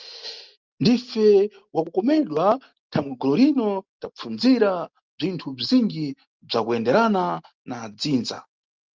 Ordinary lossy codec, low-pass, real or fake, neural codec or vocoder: Opus, 32 kbps; 7.2 kHz; real; none